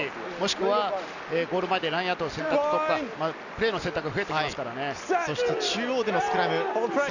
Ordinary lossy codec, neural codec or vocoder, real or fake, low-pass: none; none; real; 7.2 kHz